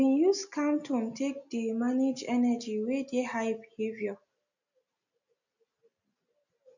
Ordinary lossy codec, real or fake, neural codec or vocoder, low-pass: none; real; none; 7.2 kHz